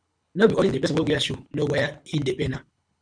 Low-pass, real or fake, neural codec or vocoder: 9.9 kHz; fake; codec, 24 kHz, 6 kbps, HILCodec